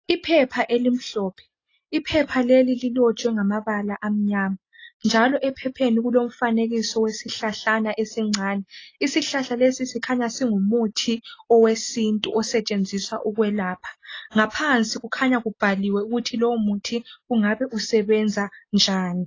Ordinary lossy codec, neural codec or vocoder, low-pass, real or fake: AAC, 32 kbps; none; 7.2 kHz; real